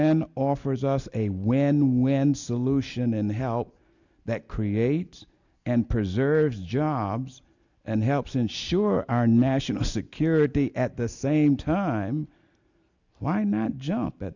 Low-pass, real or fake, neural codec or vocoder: 7.2 kHz; real; none